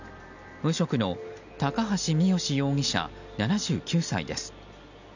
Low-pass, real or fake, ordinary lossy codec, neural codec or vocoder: 7.2 kHz; real; none; none